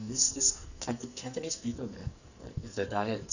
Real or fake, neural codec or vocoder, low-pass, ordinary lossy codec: fake; codec, 44.1 kHz, 2.6 kbps, SNAC; 7.2 kHz; none